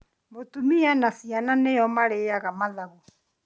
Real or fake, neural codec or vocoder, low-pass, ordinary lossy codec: real; none; none; none